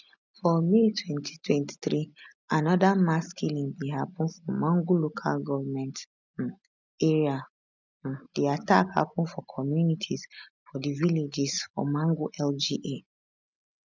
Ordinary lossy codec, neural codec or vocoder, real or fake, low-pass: none; none; real; 7.2 kHz